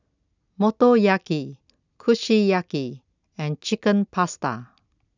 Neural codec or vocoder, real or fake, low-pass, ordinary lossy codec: none; real; 7.2 kHz; none